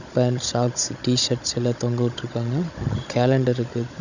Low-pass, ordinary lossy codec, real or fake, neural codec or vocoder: 7.2 kHz; none; fake; codec, 16 kHz, 16 kbps, FunCodec, trained on Chinese and English, 50 frames a second